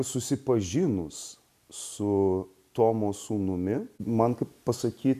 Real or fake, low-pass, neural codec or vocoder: real; 14.4 kHz; none